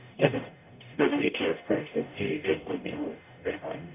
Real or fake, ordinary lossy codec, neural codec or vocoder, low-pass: fake; none; codec, 44.1 kHz, 0.9 kbps, DAC; 3.6 kHz